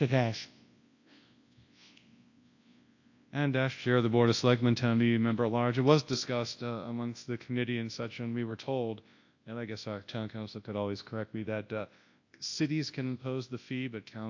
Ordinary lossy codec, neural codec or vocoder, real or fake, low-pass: AAC, 48 kbps; codec, 24 kHz, 0.9 kbps, WavTokenizer, large speech release; fake; 7.2 kHz